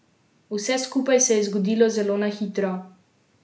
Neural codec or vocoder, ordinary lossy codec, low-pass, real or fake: none; none; none; real